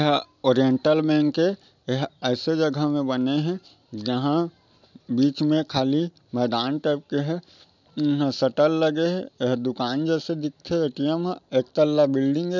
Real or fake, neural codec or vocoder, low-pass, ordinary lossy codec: real; none; 7.2 kHz; none